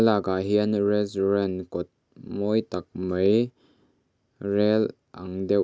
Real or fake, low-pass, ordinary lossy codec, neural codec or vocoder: real; none; none; none